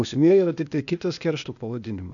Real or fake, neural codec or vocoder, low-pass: fake; codec, 16 kHz, 0.8 kbps, ZipCodec; 7.2 kHz